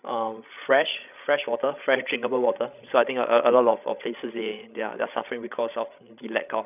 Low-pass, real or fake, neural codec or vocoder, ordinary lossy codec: 3.6 kHz; fake; codec, 16 kHz, 16 kbps, FreqCodec, larger model; none